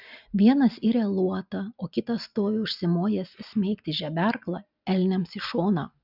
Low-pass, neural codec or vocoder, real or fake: 5.4 kHz; none; real